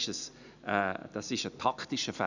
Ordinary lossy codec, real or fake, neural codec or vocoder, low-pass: none; real; none; 7.2 kHz